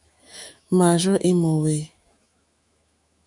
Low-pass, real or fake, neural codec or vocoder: 10.8 kHz; fake; autoencoder, 48 kHz, 128 numbers a frame, DAC-VAE, trained on Japanese speech